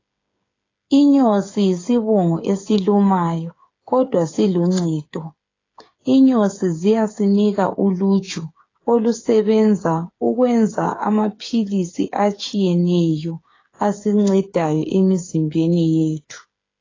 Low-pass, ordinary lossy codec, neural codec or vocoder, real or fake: 7.2 kHz; AAC, 32 kbps; codec, 16 kHz, 8 kbps, FreqCodec, smaller model; fake